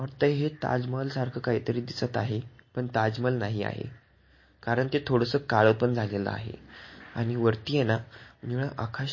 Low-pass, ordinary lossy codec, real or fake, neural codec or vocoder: 7.2 kHz; MP3, 32 kbps; real; none